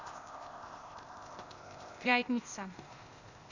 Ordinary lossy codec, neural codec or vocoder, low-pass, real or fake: none; codec, 16 kHz, 0.8 kbps, ZipCodec; 7.2 kHz; fake